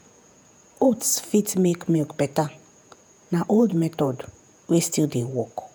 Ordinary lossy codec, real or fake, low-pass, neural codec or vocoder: none; fake; none; vocoder, 48 kHz, 128 mel bands, Vocos